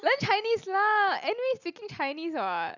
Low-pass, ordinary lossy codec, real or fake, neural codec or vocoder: 7.2 kHz; none; real; none